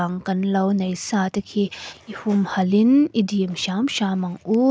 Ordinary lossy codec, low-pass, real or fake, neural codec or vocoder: none; none; real; none